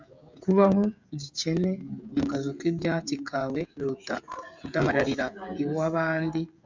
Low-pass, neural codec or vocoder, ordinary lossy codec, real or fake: 7.2 kHz; codec, 16 kHz, 16 kbps, FreqCodec, smaller model; MP3, 64 kbps; fake